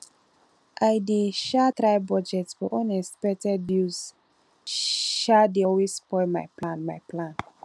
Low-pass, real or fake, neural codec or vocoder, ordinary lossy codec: none; real; none; none